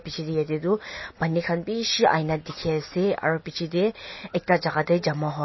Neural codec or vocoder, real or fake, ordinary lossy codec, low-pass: none; real; MP3, 24 kbps; 7.2 kHz